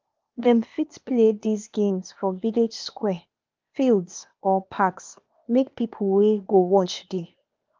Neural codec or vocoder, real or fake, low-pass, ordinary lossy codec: codec, 16 kHz, 0.8 kbps, ZipCodec; fake; 7.2 kHz; Opus, 24 kbps